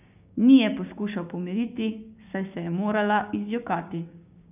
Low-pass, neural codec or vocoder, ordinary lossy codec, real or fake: 3.6 kHz; codec, 16 kHz, 6 kbps, DAC; none; fake